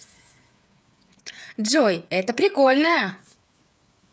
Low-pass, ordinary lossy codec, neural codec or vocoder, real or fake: none; none; codec, 16 kHz, 8 kbps, FreqCodec, smaller model; fake